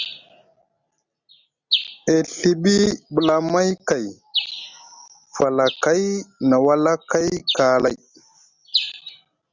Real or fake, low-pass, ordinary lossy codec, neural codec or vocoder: real; 7.2 kHz; Opus, 64 kbps; none